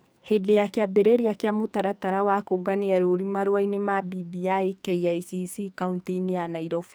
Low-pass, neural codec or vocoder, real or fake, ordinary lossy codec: none; codec, 44.1 kHz, 2.6 kbps, SNAC; fake; none